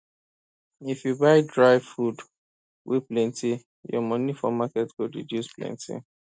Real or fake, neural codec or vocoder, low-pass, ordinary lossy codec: real; none; none; none